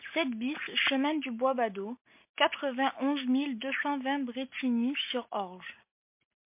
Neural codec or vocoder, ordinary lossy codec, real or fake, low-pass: none; MP3, 32 kbps; real; 3.6 kHz